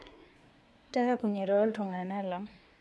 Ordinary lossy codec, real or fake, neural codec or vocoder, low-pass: none; fake; codec, 24 kHz, 1 kbps, SNAC; none